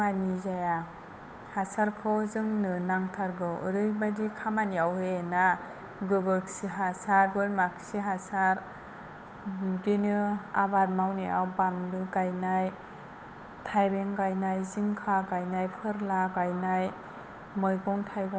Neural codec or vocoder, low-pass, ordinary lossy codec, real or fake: codec, 16 kHz, 8 kbps, FunCodec, trained on Chinese and English, 25 frames a second; none; none; fake